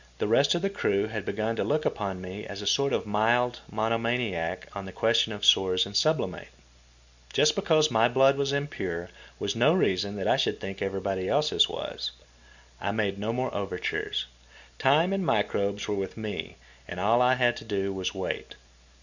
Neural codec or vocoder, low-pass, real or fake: none; 7.2 kHz; real